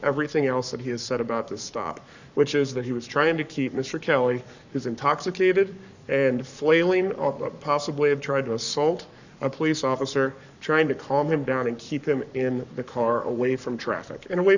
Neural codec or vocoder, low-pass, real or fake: codec, 44.1 kHz, 7.8 kbps, Pupu-Codec; 7.2 kHz; fake